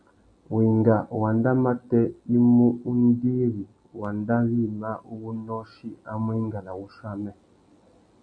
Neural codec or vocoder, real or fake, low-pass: none; real; 9.9 kHz